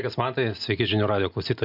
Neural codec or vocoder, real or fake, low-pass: none; real; 5.4 kHz